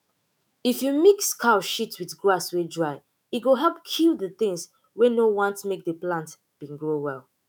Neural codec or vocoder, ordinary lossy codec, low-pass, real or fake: autoencoder, 48 kHz, 128 numbers a frame, DAC-VAE, trained on Japanese speech; none; none; fake